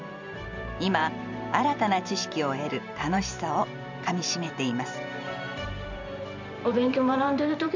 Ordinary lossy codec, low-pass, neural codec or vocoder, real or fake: none; 7.2 kHz; vocoder, 44.1 kHz, 128 mel bands every 512 samples, BigVGAN v2; fake